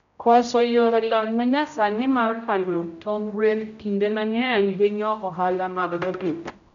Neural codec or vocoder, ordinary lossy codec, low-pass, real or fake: codec, 16 kHz, 0.5 kbps, X-Codec, HuBERT features, trained on general audio; MP3, 96 kbps; 7.2 kHz; fake